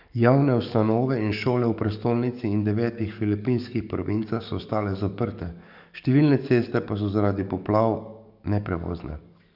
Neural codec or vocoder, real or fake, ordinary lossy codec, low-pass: codec, 44.1 kHz, 7.8 kbps, DAC; fake; none; 5.4 kHz